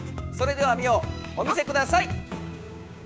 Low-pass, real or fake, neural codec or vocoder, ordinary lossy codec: none; fake; codec, 16 kHz, 6 kbps, DAC; none